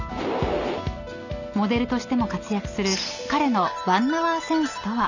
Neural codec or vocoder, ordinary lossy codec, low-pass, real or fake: none; none; 7.2 kHz; real